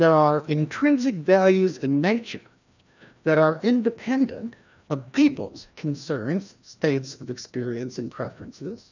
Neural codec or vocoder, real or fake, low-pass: codec, 16 kHz, 1 kbps, FreqCodec, larger model; fake; 7.2 kHz